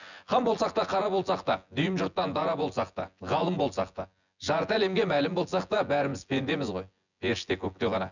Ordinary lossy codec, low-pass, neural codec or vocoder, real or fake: none; 7.2 kHz; vocoder, 24 kHz, 100 mel bands, Vocos; fake